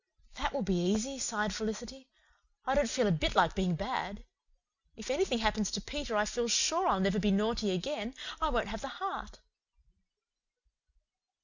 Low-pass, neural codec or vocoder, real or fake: 7.2 kHz; none; real